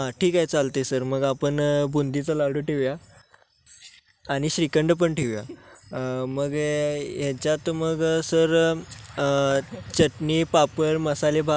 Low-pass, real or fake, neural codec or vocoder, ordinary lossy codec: none; real; none; none